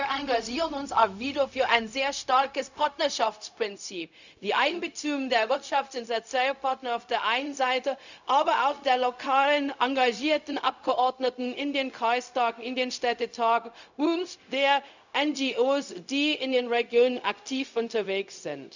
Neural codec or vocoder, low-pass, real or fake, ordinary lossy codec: codec, 16 kHz, 0.4 kbps, LongCat-Audio-Codec; 7.2 kHz; fake; none